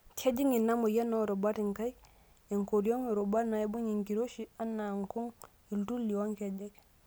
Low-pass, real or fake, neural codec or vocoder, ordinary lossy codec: none; real; none; none